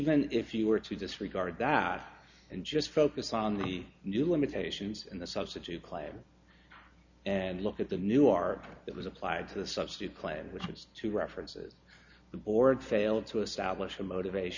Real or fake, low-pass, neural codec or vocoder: real; 7.2 kHz; none